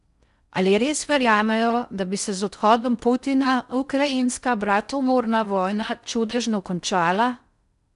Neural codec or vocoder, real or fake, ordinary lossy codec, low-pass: codec, 16 kHz in and 24 kHz out, 0.6 kbps, FocalCodec, streaming, 4096 codes; fake; none; 10.8 kHz